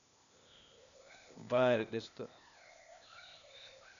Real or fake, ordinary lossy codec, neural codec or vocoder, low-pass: fake; none; codec, 16 kHz, 0.8 kbps, ZipCodec; 7.2 kHz